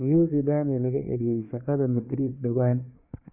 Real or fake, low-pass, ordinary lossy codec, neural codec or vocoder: fake; 3.6 kHz; none; codec, 24 kHz, 1 kbps, SNAC